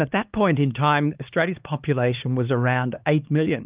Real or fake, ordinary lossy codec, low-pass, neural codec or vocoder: fake; Opus, 32 kbps; 3.6 kHz; codec, 16 kHz, 2 kbps, X-Codec, HuBERT features, trained on LibriSpeech